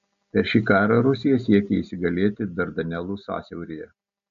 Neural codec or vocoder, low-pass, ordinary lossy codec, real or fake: none; 7.2 kHz; AAC, 96 kbps; real